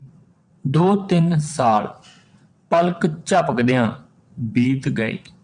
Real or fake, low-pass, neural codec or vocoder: fake; 9.9 kHz; vocoder, 22.05 kHz, 80 mel bands, WaveNeXt